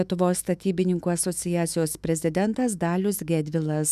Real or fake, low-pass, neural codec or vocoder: fake; 14.4 kHz; autoencoder, 48 kHz, 128 numbers a frame, DAC-VAE, trained on Japanese speech